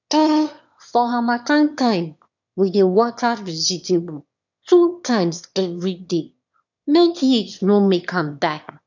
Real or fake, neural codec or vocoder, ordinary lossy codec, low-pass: fake; autoencoder, 22.05 kHz, a latent of 192 numbers a frame, VITS, trained on one speaker; none; 7.2 kHz